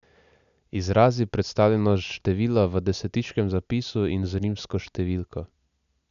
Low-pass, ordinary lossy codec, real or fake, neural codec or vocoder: 7.2 kHz; none; real; none